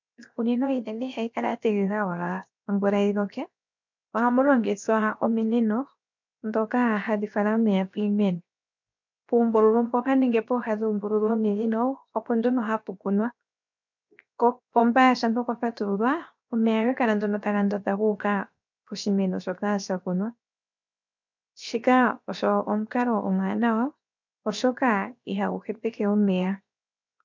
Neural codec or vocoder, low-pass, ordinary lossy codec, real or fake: codec, 16 kHz, 0.7 kbps, FocalCodec; 7.2 kHz; MP3, 64 kbps; fake